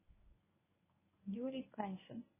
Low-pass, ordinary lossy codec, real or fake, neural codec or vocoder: 3.6 kHz; MP3, 16 kbps; fake; codec, 24 kHz, 0.9 kbps, WavTokenizer, medium speech release version 1